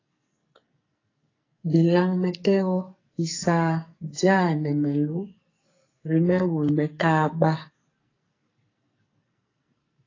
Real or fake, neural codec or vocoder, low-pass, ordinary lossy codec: fake; codec, 44.1 kHz, 2.6 kbps, SNAC; 7.2 kHz; AAC, 32 kbps